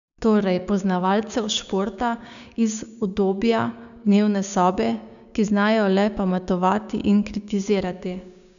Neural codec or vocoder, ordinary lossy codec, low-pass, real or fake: codec, 16 kHz, 6 kbps, DAC; none; 7.2 kHz; fake